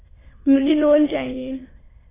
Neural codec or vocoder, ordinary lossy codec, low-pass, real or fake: autoencoder, 22.05 kHz, a latent of 192 numbers a frame, VITS, trained on many speakers; AAC, 16 kbps; 3.6 kHz; fake